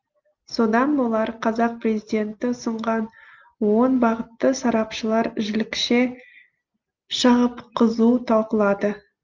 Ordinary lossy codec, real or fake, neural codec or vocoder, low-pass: Opus, 32 kbps; real; none; 7.2 kHz